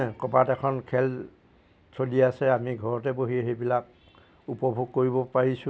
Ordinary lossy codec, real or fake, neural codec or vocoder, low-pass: none; real; none; none